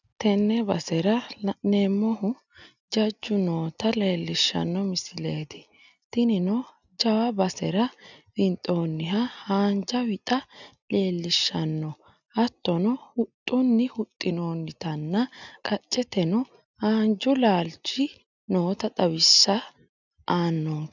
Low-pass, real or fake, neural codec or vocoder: 7.2 kHz; real; none